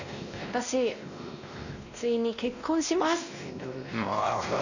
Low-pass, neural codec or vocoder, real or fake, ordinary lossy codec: 7.2 kHz; codec, 16 kHz, 1 kbps, X-Codec, WavLM features, trained on Multilingual LibriSpeech; fake; none